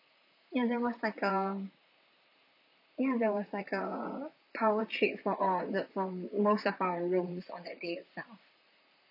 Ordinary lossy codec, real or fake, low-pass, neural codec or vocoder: none; fake; 5.4 kHz; vocoder, 44.1 kHz, 128 mel bands, Pupu-Vocoder